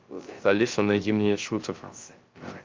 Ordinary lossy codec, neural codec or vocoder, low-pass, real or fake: Opus, 24 kbps; codec, 16 kHz, 0.3 kbps, FocalCodec; 7.2 kHz; fake